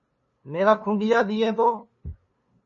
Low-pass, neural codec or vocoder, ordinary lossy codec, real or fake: 7.2 kHz; codec, 16 kHz, 2 kbps, FunCodec, trained on LibriTTS, 25 frames a second; MP3, 32 kbps; fake